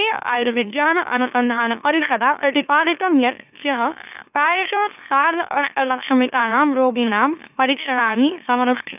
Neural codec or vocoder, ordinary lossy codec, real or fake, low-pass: autoencoder, 44.1 kHz, a latent of 192 numbers a frame, MeloTTS; none; fake; 3.6 kHz